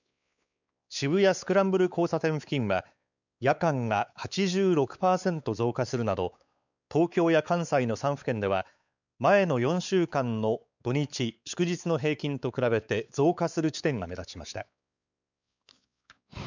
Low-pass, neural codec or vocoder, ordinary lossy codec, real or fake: 7.2 kHz; codec, 16 kHz, 4 kbps, X-Codec, WavLM features, trained on Multilingual LibriSpeech; none; fake